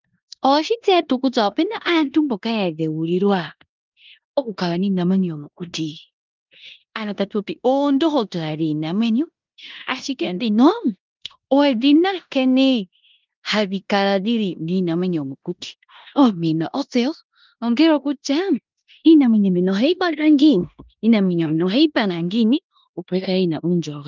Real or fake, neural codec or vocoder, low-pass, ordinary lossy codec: fake; codec, 16 kHz in and 24 kHz out, 0.9 kbps, LongCat-Audio-Codec, four codebook decoder; 7.2 kHz; Opus, 32 kbps